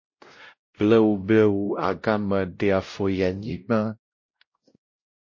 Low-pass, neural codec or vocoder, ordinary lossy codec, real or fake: 7.2 kHz; codec, 16 kHz, 0.5 kbps, X-Codec, WavLM features, trained on Multilingual LibriSpeech; MP3, 32 kbps; fake